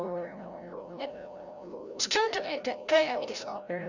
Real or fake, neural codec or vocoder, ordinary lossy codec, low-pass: fake; codec, 16 kHz, 0.5 kbps, FreqCodec, larger model; none; 7.2 kHz